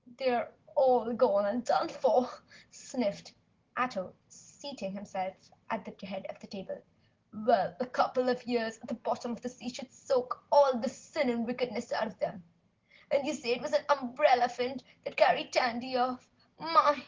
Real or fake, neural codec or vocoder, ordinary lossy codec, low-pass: real; none; Opus, 16 kbps; 7.2 kHz